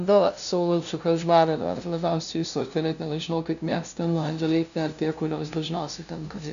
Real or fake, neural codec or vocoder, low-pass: fake; codec, 16 kHz, 0.5 kbps, FunCodec, trained on LibriTTS, 25 frames a second; 7.2 kHz